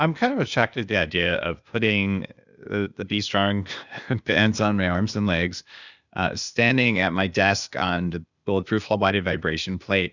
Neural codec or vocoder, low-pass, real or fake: codec, 16 kHz, 0.8 kbps, ZipCodec; 7.2 kHz; fake